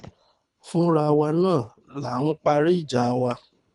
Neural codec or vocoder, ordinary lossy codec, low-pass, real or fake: codec, 24 kHz, 3 kbps, HILCodec; none; 10.8 kHz; fake